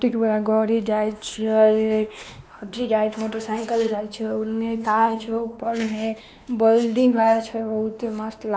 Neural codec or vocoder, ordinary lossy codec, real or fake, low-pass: codec, 16 kHz, 2 kbps, X-Codec, WavLM features, trained on Multilingual LibriSpeech; none; fake; none